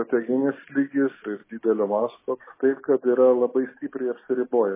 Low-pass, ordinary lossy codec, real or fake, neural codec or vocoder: 3.6 kHz; MP3, 16 kbps; real; none